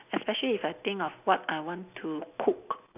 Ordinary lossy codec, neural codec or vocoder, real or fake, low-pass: none; none; real; 3.6 kHz